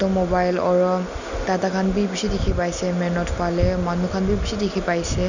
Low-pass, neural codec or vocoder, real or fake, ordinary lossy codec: 7.2 kHz; none; real; none